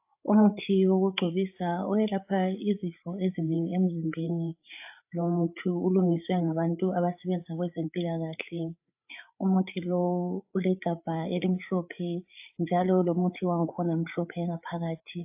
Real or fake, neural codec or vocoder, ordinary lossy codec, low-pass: fake; codec, 16 kHz, 8 kbps, FreqCodec, larger model; AAC, 32 kbps; 3.6 kHz